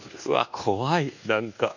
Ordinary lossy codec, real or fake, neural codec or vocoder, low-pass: none; fake; codec, 24 kHz, 1.2 kbps, DualCodec; 7.2 kHz